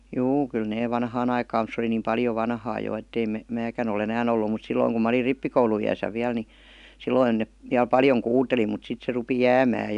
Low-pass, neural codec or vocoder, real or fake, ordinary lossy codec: 10.8 kHz; none; real; none